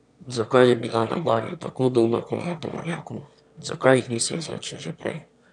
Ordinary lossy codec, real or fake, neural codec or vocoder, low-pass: none; fake; autoencoder, 22.05 kHz, a latent of 192 numbers a frame, VITS, trained on one speaker; 9.9 kHz